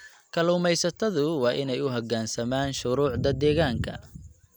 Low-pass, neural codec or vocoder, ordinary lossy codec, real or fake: none; none; none; real